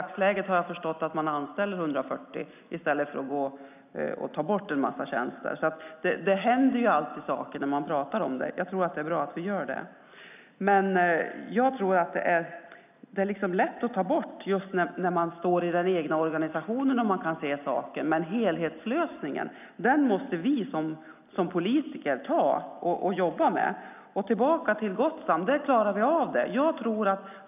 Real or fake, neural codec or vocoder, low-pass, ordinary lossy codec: real; none; 3.6 kHz; none